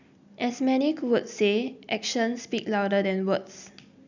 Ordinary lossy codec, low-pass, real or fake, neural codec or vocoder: none; 7.2 kHz; real; none